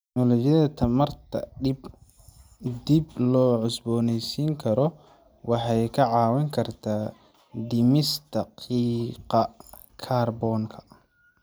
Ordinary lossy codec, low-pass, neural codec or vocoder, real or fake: none; none; none; real